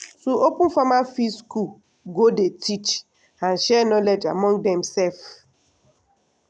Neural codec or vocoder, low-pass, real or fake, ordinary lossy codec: none; 9.9 kHz; real; none